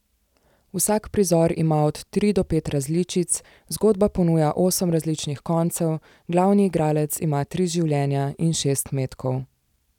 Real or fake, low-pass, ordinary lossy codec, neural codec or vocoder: real; 19.8 kHz; none; none